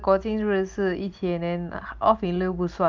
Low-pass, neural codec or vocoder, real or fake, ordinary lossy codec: 7.2 kHz; none; real; Opus, 32 kbps